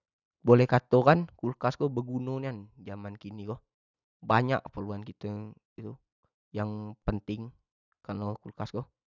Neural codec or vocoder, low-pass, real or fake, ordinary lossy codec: none; 7.2 kHz; real; none